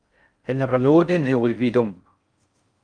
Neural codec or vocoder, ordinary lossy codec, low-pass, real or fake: codec, 16 kHz in and 24 kHz out, 0.6 kbps, FocalCodec, streaming, 4096 codes; Opus, 32 kbps; 9.9 kHz; fake